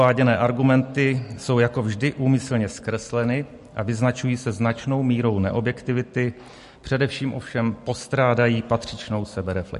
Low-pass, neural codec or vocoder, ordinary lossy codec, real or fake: 14.4 kHz; none; MP3, 48 kbps; real